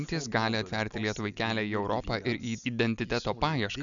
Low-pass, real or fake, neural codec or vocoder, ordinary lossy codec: 7.2 kHz; real; none; MP3, 96 kbps